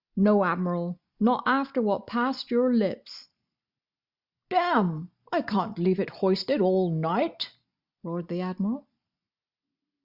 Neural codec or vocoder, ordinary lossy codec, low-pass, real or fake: none; Opus, 64 kbps; 5.4 kHz; real